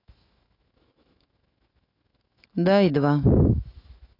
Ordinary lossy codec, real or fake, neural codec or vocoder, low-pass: AAC, 32 kbps; fake; autoencoder, 48 kHz, 128 numbers a frame, DAC-VAE, trained on Japanese speech; 5.4 kHz